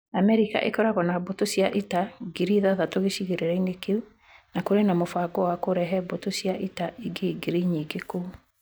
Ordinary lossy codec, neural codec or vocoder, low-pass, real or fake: none; none; none; real